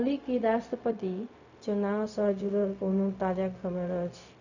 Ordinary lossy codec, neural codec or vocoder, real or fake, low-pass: none; codec, 16 kHz, 0.4 kbps, LongCat-Audio-Codec; fake; 7.2 kHz